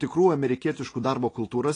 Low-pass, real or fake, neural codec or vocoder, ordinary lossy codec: 9.9 kHz; real; none; AAC, 32 kbps